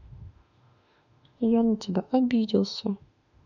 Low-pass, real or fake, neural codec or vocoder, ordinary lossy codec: 7.2 kHz; fake; autoencoder, 48 kHz, 32 numbers a frame, DAC-VAE, trained on Japanese speech; none